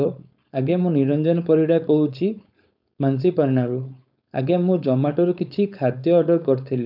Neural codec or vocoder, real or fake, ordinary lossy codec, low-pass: codec, 16 kHz, 4.8 kbps, FACodec; fake; none; 5.4 kHz